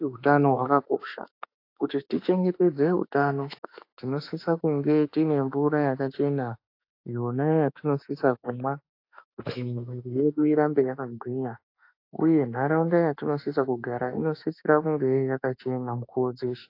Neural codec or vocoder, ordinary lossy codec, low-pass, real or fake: autoencoder, 48 kHz, 32 numbers a frame, DAC-VAE, trained on Japanese speech; AAC, 32 kbps; 5.4 kHz; fake